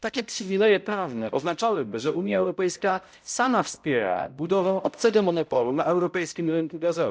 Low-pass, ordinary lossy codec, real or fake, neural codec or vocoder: none; none; fake; codec, 16 kHz, 0.5 kbps, X-Codec, HuBERT features, trained on balanced general audio